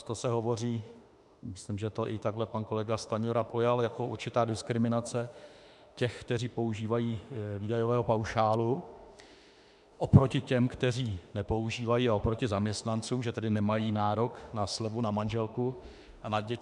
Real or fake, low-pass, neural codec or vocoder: fake; 10.8 kHz; autoencoder, 48 kHz, 32 numbers a frame, DAC-VAE, trained on Japanese speech